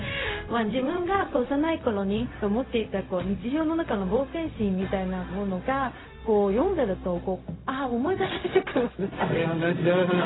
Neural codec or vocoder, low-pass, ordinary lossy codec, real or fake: codec, 16 kHz, 0.4 kbps, LongCat-Audio-Codec; 7.2 kHz; AAC, 16 kbps; fake